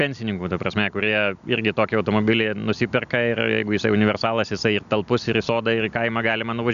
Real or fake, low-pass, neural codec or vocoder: real; 7.2 kHz; none